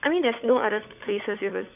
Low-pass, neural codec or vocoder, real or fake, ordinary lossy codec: 3.6 kHz; codec, 16 kHz, 16 kbps, FunCodec, trained on LibriTTS, 50 frames a second; fake; none